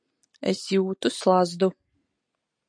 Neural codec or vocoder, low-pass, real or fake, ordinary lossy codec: none; 9.9 kHz; real; MP3, 48 kbps